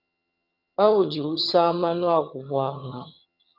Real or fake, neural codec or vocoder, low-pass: fake; vocoder, 22.05 kHz, 80 mel bands, HiFi-GAN; 5.4 kHz